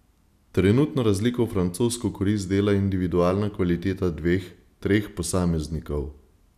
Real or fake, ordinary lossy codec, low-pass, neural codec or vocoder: real; none; 14.4 kHz; none